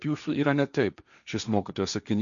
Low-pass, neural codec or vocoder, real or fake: 7.2 kHz; codec, 16 kHz, 1.1 kbps, Voila-Tokenizer; fake